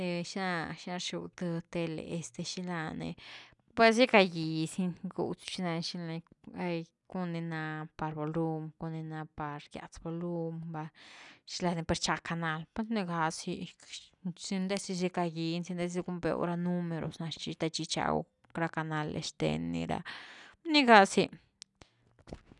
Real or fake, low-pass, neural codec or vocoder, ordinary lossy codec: real; 10.8 kHz; none; none